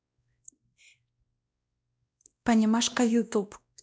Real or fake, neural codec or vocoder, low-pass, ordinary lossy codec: fake; codec, 16 kHz, 1 kbps, X-Codec, WavLM features, trained on Multilingual LibriSpeech; none; none